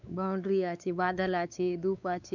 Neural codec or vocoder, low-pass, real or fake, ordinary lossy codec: codec, 16 kHz, 4 kbps, X-Codec, WavLM features, trained on Multilingual LibriSpeech; 7.2 kHz; fake; none